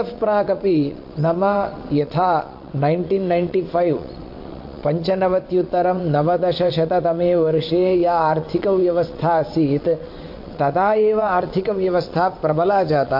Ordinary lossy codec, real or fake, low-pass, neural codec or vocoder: MP3, 32 kbps; fake; 5.4 kHz; vocoder, 22.05 kHz, 80 mel bands, WaveNeXt